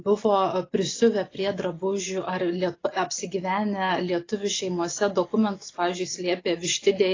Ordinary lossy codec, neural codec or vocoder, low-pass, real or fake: AAC, 32 kbps; none; 7.2 kHz; real